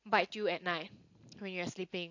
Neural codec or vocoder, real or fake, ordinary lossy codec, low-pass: none; real; AAC, 48 kbps; 7.2 kHz